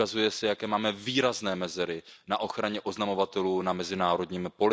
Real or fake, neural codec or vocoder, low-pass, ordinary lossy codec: real; none; none; none